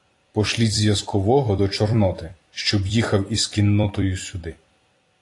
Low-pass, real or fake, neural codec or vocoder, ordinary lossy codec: 10.8 kHz; fake; vocoder, 44.1 kHz, 128 mel bands every 256 samples, BigVGAN v2; AAC, 48 kbps